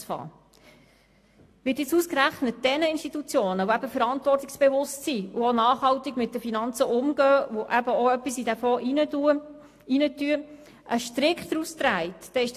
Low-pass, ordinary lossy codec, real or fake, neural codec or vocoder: 14.4 kHz; AAC, 64 kbps; real; none